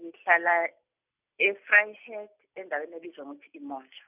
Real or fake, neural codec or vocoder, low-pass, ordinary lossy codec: real; none; 3.6 kHz; none